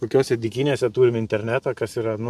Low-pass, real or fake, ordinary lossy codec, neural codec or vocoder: 14.4 kHz; fake; MP3, 96 kbps; vocoder, 44.1 kHz, 128 mel bands every 512 samples, BigVGAN v2